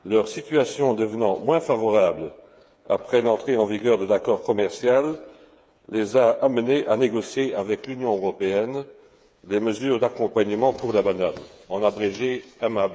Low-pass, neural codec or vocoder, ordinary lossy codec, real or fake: none; codec, 16 kHz, 8 kbps, FreqCodec, smaller model; none; fake